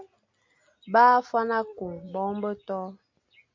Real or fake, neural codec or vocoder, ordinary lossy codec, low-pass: real; none; MP3, 48 kbps; 7.2 kHz